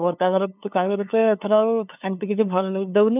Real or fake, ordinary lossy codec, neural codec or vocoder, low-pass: fake; none; codec, 16 kHz, 2 kbps, FunCodec, trained on LibriTTS, 25 frames a second; 3.6 kHz